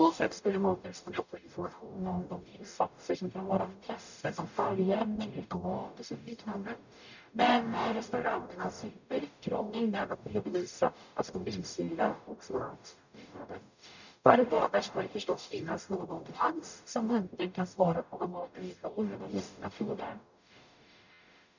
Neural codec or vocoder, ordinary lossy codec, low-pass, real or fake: codec, 44.1 kHz, 0.9 kbps, DAC; none; 7.2 kHz; fake